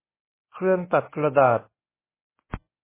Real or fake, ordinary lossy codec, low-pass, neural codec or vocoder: fake; MP3, 16 kbps; 3.6 kHz; codec, 24 kHz, 0.9 kbps, WavTokenizer, medium speech release version 1